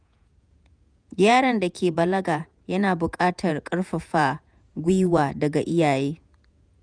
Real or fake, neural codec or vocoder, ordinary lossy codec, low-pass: fake; vocoder, 48 kHz, 128 mel bands, Vocos; none; 9.9 kHz